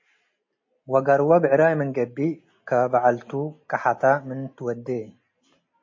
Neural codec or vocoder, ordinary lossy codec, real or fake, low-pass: none; MP3, 32 kbps; real; 7.2 kHz